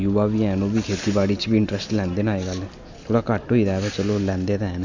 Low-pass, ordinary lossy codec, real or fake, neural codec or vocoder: 7.2 kHz; Opus, 64 kbps; real; none